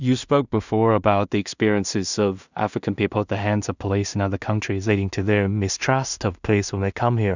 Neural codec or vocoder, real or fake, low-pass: codec, 16 kHz in and 24 kHz out, 0.4 kbps, LongCat-Audio-Codec, two codebook decoder; fake; 7.2 kHz